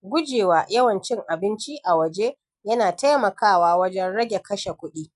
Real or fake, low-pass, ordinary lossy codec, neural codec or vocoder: real; 10.8 kHz; none; none